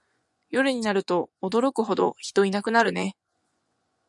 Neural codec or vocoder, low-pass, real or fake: vocoder, 24 kHz, 100 mel bands, Vocos; 10.8 kHz; fake